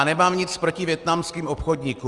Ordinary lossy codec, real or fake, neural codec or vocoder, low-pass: Opus, 32 kbps; real; none; 10.8 kHz